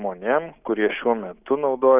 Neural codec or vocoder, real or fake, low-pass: none; real; 3.6 kHz